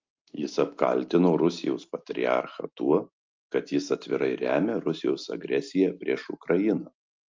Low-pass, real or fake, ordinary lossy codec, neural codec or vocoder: 7.2 kHz; real; Opus, 24 kbps; none